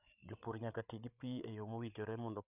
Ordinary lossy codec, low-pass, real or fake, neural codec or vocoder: none; 3.6 kHz; fake; codec, 16 kHz, 8 kbps, FreqCodec, larger model